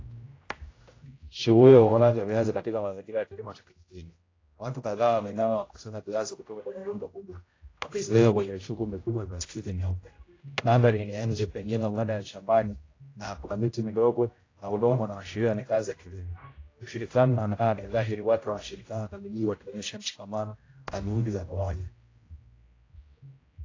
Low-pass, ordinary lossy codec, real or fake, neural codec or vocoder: 7.2 kHz; AAC, 32 kbps; fake; codec, 16 kHz, 0.5 kbps, X-Codec, HuBERT features, trained on general audio